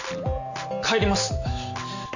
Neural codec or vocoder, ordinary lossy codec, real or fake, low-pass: none; none; real; 7.2 kHz